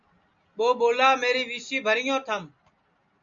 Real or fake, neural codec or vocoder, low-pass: real; none; 7.2 kHz